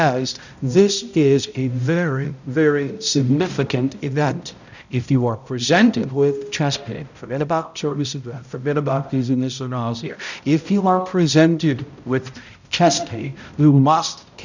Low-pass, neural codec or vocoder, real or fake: 7.2 kHz; codec, 16 kHz, 0.5 kbps, X-Codec, HuBERT features, trained on balanced general audio; fake